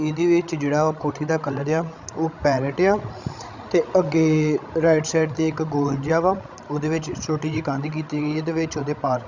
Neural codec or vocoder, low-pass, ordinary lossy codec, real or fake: codec, 16 kHz, 16 kbps, FreqCodec, larger model; 7.2 kHz; Opus, 64 kbps; fake